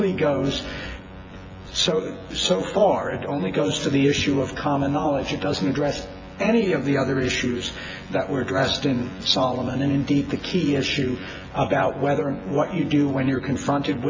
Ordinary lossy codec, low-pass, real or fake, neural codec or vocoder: AAC, 48 kbps; 7.2 kHz; fake; vocoder, 24 kHz, 100 mel bands, Vocos